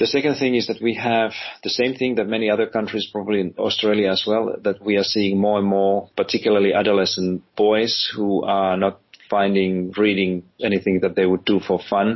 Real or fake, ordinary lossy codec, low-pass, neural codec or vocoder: real; MP3, 24 kbps; 7.2 kHz; none